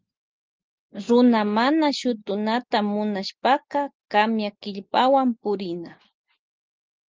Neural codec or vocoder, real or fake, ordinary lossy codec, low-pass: none; real; Opus, 32 kbps; 7.2 kHz